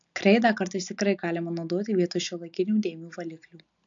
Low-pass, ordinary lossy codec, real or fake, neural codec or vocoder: 7.2 kHz; AAC, 64 kbps; real; none